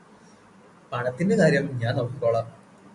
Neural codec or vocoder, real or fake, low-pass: none; real; 10.8 kHz